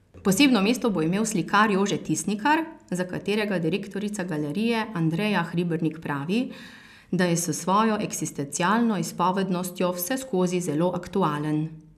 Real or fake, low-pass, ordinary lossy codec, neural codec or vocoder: real; 14.4 kHz; none; none